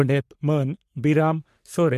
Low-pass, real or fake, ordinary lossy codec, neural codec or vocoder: 14.4 kHz; fake; MP3, 64 kbps; codec, 44.1 kHz, 3.4 kbps, Pupu-Codec